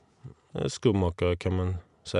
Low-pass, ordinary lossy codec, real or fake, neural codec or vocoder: 10.8 kHz; none; real; none